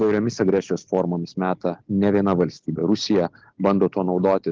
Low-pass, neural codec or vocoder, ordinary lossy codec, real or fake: 7.2 kHz; none; Opus, 16 kbps; real